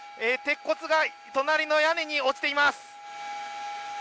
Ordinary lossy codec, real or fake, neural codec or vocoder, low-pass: none; real; none; none